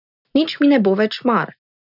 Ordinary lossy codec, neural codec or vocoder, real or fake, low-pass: none; none; real; 5.4 kHz